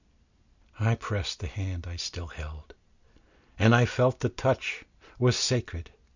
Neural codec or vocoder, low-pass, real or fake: none; 7.2 kHz; real